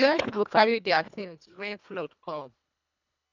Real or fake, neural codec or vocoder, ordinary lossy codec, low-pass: fake; codec, 24 kHz, 1.5 kbps, HILCodec; none; 7.2 kHz